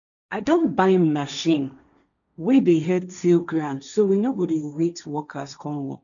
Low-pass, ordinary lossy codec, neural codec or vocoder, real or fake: 7.2 kHz; none; codec, 16 kHz, 1.1 kbps, Voila-Tokenizer; fake